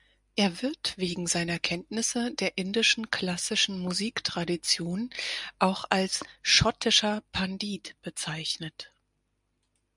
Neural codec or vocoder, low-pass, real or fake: none; 10.8 kHz; real